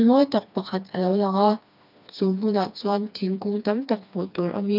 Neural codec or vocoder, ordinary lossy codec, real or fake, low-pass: codec, 16 kHz, 2 kbps, FreqCodec, smaller model; none; fake; 5.4 kHz